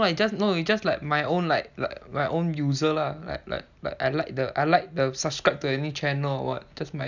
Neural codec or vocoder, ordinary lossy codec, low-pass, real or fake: none; none; 7.2 kHz; real